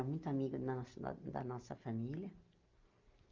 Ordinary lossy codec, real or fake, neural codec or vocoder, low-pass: Opus, 24 kbps; real; none; 7.2 kHz